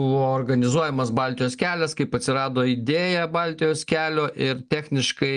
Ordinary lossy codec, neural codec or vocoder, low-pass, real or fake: Opus, 32 kbps; none; 9.9 kHz; real